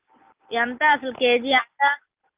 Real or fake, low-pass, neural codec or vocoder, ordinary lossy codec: real; 3.6 kHz; none; Opus, 32 kbps